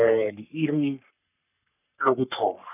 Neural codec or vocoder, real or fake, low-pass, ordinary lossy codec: codec, 44.1 kHz, 3.4 kbps, Pupu-Codec; fake; 3.6 kHz; none